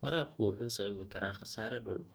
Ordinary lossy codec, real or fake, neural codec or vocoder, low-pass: none; fake; codec, 44.1 kHz, 2.6 kbps, DAC; none